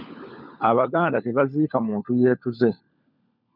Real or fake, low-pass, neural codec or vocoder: fake; 5.4 kHz; codec, 16 kHz, 16 kbps, FunCodec, trained on LibriTTS, 50 frames a second